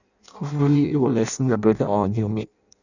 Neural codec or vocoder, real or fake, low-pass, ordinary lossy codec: codec, 16 kHz in and 24 kHz out, 0.6 kbps, FireRedTTS-2 codec; fake; 7.2 kHz; none